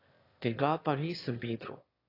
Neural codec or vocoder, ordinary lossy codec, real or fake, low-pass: autoencoder, 22.05 kHz, a latent of 192 numbers a frame, VITS, trained on one speaker; AAC, 24 kbps; fake; 5.4 kHz